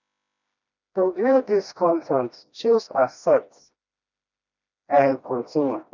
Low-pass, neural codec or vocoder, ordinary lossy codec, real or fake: 7.2 kHz; codec, 16 kHz, 1 kbps, FreqCodec, smaller model; none; fake